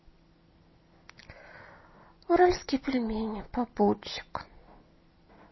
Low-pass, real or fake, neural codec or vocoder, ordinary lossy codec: 7.2 kHz; real; none; MP3, 24 kbps